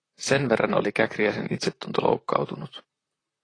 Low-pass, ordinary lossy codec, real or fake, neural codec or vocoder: 9.9 kHz; AAC, 32 kbps; fake; vocoder, 44.1 kHz, 128 mel bands, Pupu-Vocoder